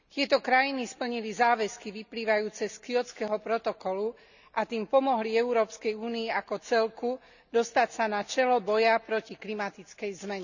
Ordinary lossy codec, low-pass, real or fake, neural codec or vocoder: none; 7.2 kHz; real; none